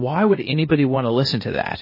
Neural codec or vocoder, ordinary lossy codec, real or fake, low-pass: codec, 16 kHz, 0.8 kbps, ZipCodec; MP3, 24 kbps; fake; 5.4 kHz